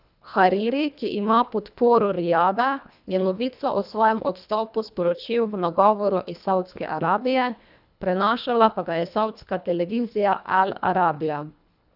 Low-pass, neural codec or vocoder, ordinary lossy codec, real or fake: 5.4 kHz; codec, 24 kHz, 1.5 kbps, HILCodec; none; fake